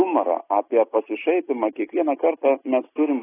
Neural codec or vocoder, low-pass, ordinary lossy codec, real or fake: none; 3.6 kHz; AAC, 16 kbps; real